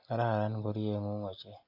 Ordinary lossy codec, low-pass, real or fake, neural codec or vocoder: AAC, 32 kbps; 5.4 kHz; real; none